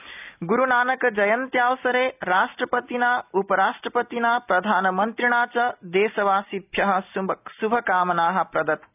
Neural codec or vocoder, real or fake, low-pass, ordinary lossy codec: none; real; 3.6 kHz; none